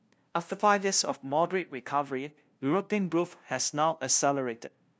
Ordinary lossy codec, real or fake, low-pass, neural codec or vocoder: none; fake; none; codec, 16 kHz, 0.5 kbps, FunCodec, trained on LibriTTS, 25 frames a second